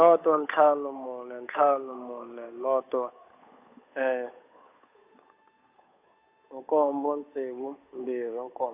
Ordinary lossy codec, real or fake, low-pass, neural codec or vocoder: AAC, 24 kbps; fake; 3.6 kHz; codec, 16 kHz, 8 kbps, FunCodec, trained on Chinese and English, 25 frames a second